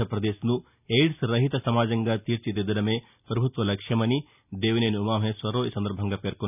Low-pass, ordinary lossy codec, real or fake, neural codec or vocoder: 3.6 kHz; none; real; none